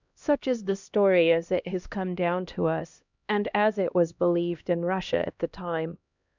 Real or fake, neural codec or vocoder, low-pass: fake; codec, 16 kHz, 1 kbps, X-Codec, HuBERT features, trained on LibriSpeech; 7.2 kHz